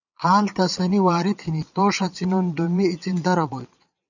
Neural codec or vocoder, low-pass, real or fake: vocoder, 22.05 kHz, 80 mel bands, Vocos; 7.2 kHz; fake